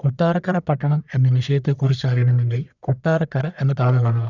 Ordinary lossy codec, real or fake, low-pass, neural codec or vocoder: none; fake; 7.2 kHz; codec, 44.1 kHz, 1.7 kbps, Pupu-Codec